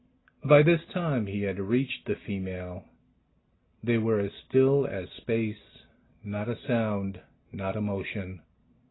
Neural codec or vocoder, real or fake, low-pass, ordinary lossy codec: none; real; 7.2 kHz; AAC, 16 kbps